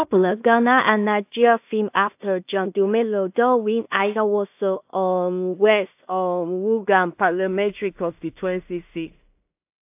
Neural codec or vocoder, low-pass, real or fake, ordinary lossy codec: codec, 16 kHz in and 24 kHz out, 0.4 kbps, LongCat-Audio-Codec, two codebook decoder; 3.6 kHz; fake; AAC, 32 kbps